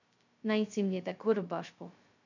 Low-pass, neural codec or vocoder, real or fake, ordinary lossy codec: 7.2 kHz; codec, 16 kHz, 0.2 kbps, FocalCodec; fake; none